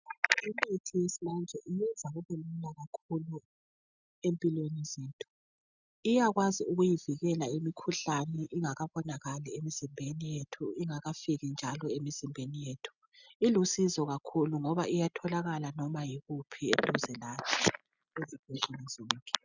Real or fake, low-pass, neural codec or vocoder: real; 7.2 kHz; none